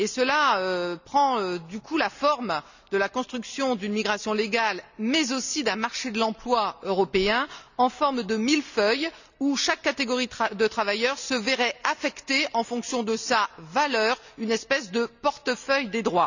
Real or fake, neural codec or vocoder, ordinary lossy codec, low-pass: real; none; none; 7.2 kHz